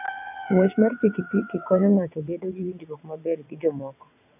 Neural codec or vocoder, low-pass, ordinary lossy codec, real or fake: vocoder, 22.05 kHz, 80 mel bands, WaveNeXt; 3.6 kHz; none; fake